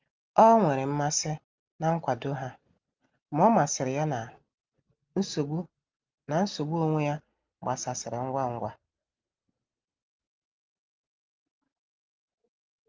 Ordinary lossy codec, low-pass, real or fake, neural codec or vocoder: Opus, 32 kbps; 7.2 kHz; real; none